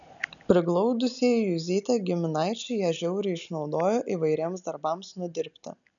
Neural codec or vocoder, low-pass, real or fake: none; 7.2 kHz; real